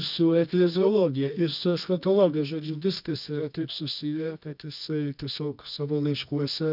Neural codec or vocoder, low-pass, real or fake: codec, 24 kHz, 0.9 kbps, WavTokenizer, medium music audio release; 5.4 kHz; fake